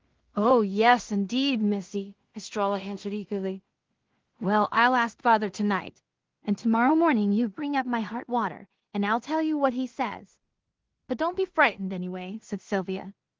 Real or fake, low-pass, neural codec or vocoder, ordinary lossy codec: fake; 7.2 kHz; codec, 16 kHz in and 24 kHz out, 0.4 kbps, LongCat-Audio-Codec, two codebook decoder; Opus, 16 kbps